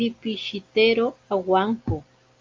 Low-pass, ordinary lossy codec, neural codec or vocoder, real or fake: 7.2 kHz; Opus, 24 kbps; none; real